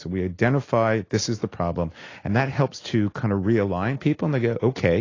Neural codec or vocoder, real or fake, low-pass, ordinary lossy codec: none; real; 7.2 kHz; AAC, 32 kbps